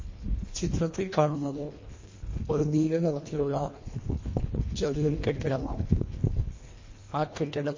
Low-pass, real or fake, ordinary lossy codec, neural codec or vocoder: 7.2 kHz; fake; MP3, 32 kbps; codec, 24 kHz, 1.5 kbps, HILCodec